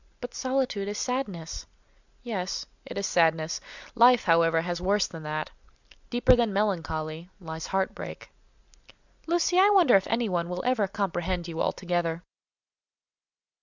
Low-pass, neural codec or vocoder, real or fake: 7.2 kHz; none; real